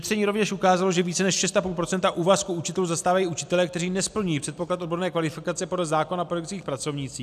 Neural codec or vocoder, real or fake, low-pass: none; real; 14.4 kHz